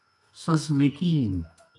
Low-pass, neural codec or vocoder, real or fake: 10.8 kHz; codec, 24 kHz, 0.9 kbps, WavTokenizer, medium music audio release; fake